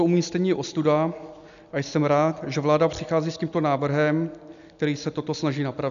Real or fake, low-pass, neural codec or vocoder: real; 7.2 kHz; none